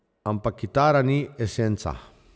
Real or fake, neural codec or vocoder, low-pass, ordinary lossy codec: real; none; none; none